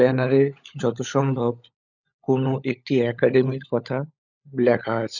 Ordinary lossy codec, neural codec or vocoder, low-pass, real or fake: none; codec, 16 kHz, 16 kbps, FunCodec, trained on LibriTTS, 50 frames a second; 7.2 kHz; fake